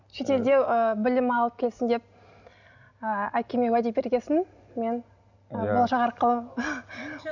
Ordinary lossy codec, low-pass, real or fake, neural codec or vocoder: none; 7.2 kHz; real; none